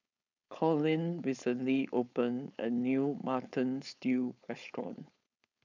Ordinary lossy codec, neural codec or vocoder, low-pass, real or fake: none; codec, 16 kHz, 4.8 kbps, FACodec; 7.2 kHz; fake